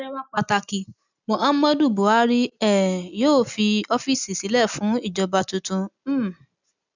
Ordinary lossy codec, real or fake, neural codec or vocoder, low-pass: none; real; none; 7.2 kHz